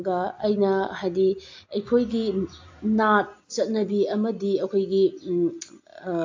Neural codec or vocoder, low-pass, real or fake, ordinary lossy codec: none; 7.2 kHz; real; AAC, 48 kbps